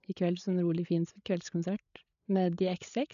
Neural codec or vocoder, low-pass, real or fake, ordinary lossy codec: codec, 16 kHz, 8 kbps, FreqCodec, larger model; 7.2 kHz; fake; none